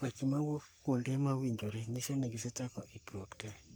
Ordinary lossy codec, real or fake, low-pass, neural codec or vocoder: none; fake; none; codec, 44.1 kHz, 3.4 kbps, Pupu-Codec